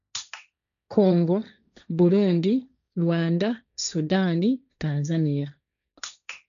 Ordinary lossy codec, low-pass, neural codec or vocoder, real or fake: none; 7.2 kHz; codec, 16 kHz, 1.1 kbps, Voila-Tokenizer; fake